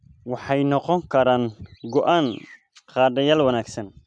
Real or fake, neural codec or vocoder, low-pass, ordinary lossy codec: real; none; 9.9 kHz; none